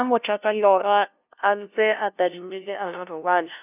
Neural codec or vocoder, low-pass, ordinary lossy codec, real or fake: codec, 16 kHz, 0.5 kbps, FunCodec, trained on LibriTTS, 25 frames a second; 3.6 kHz; none; fake